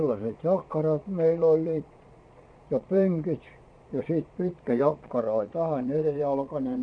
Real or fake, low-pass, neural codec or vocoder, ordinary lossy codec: fake; 9.9 kHz; vocoder, 22.05 kHz, 80 mel bands, Vocos; MP3, 48 kbps